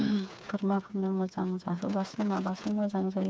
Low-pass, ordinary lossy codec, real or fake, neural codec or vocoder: none; none; fake; codec, 16 kHz, 4 kbps, FreqCodec, smaller model